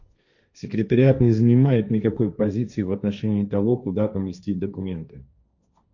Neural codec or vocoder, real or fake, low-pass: codec, 16 kHz, 1.1 kbps, Voila-Tokenizer; fake; 7.2 kHz